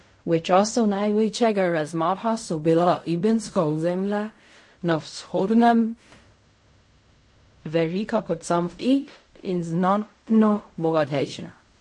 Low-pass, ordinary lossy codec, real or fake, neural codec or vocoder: 10.8 kHz; MP3, 48 kbps; fake; codec, 16 kHz in and 24 kHz out, 0.4 kbps, LongCat-Audio-Codec, fine tuned four codebook decoder